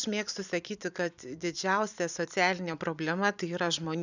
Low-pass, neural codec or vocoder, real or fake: 7.2 kHz; vocoder, 44.1 kHz, 128 mel bands every 256 samples, BigVGAN v2; fake